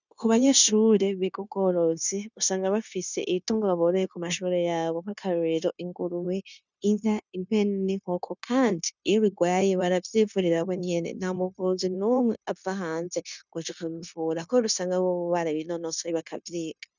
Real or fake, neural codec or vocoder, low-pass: fake; codec, 16 kHz, 0.9 kbps, LongCat-Audio-Codec; 7.2 kHz